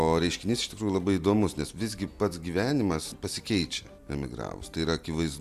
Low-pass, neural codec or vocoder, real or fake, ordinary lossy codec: 14.4 kHz; none; real; AAC, 96 kbps